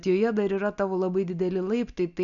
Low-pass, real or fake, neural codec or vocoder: 7.2 kHz; real; none